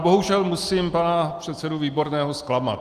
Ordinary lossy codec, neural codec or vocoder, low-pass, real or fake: Opus, 32 kbps; none; 14.4 kHz; real